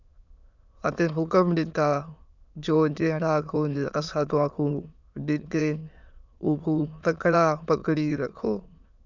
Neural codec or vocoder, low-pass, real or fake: autoencoder, 22.05 kHz, a latent of 192 numbers a frame, VITS, trained on many speakers; 7.2 kHz; fake